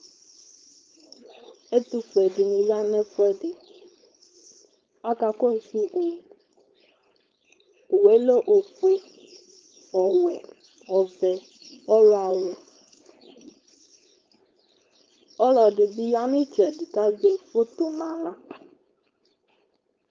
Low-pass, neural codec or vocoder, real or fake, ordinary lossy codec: 7.2 kHz; codec, 16 kHz, 4.8 kbps, FACodec; fake; Opus, 24 kbps